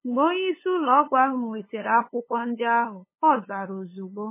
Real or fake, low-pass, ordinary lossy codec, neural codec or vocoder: fake; 3.6 kHz; MP3, 16 kbps; codec, 16 kHz, 8 kbps, FunCodec, trained on LibriTTS, 25 frames a second